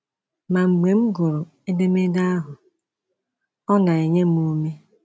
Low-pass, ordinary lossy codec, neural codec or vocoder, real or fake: none; none; none; real